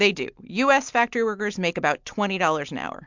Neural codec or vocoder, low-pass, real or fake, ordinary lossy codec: none; 7.2 kHz; real; MP3, 64 kbps